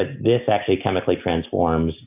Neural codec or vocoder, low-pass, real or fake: none; 3.6 kHz; real